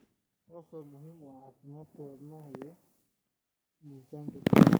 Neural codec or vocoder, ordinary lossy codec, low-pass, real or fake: codec, 44.1 kHz, 3.4 kbps, Pupu-Codec; none; none; fake